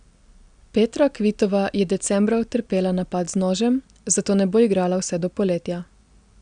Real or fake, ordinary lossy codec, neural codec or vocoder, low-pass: real; Opus, 64 kbps; none; 9.9 kHz